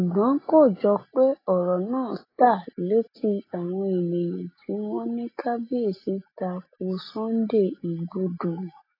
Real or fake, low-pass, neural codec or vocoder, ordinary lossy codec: real; 5.4 kHz; none; AAC, 24 kbps